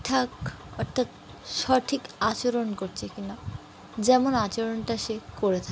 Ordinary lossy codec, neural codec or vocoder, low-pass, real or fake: none; none; none; real